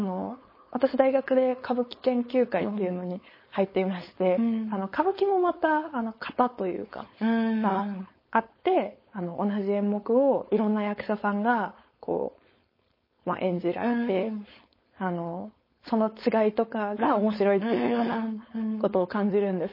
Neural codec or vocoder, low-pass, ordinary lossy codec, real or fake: codec, 16 kHz, 4.8 kbps, FACodec; 5.4 kHz; MP3, 24 kbps; fake